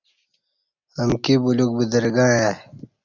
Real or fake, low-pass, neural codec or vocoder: real; 7.2 kHz; none